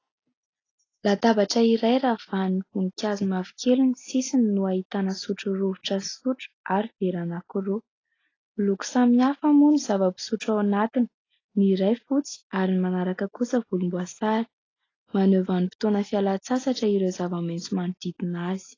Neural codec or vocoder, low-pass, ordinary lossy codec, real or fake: none; 7.2 kHz; AAC, 32 kbps; real